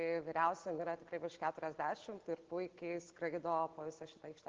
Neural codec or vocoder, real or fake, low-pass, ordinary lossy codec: none; real; 7.2 kHz; Opus, 16 kbps